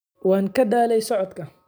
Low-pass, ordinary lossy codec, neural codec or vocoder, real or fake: none; none; none; real